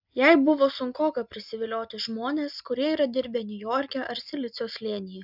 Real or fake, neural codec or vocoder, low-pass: real; none; 5.4 kHz